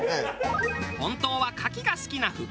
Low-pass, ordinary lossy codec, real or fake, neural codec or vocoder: none; none; real; none